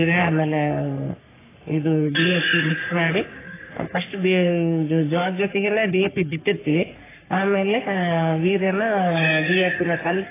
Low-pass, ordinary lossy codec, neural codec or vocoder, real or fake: 3.6 kHz; AAC, 16 kbps; codec, 44.1 kHz, 3.4 kbps, Pupu-Codec; fake